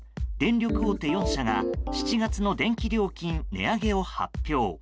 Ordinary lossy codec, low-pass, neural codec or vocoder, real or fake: none; none; none; real